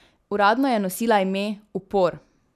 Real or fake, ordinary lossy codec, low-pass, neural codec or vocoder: real; none; 14.4 kHz; none